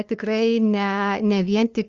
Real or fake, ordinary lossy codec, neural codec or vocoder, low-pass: fake; Opus, 32 kbps; codec, 16 kHz, 2 kbps, FunCodec, trained on LibriTTS, 25 frames a second; 7.2 kHz